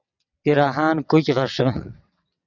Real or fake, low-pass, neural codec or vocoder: fake; 7.2 kHz; vocoder, 22.05 kHz, 80 mel bands, WaveNeXt